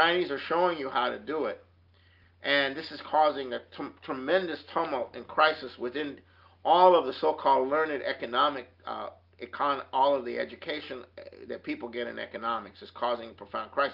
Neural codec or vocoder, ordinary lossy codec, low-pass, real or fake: none; Opus, 24 kbps; 5.4 kHz; real